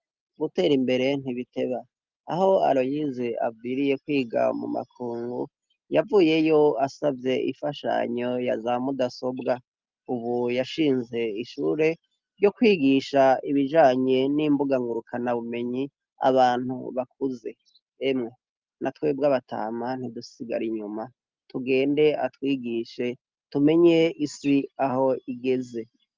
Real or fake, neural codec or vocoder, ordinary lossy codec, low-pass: real; none; Opus, 24 kbps; 7.2 kHz